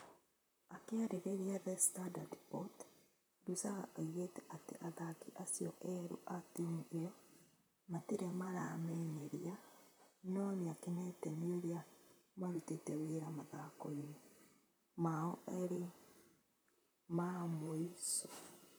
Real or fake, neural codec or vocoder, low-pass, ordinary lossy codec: fake; vocoder, 44.1 kHz, 128 mel bands, Pupu-Vocoder; none; none